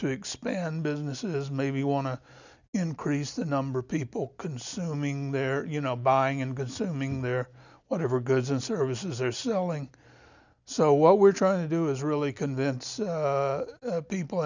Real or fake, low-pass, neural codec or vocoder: real; 7.2 kHz; none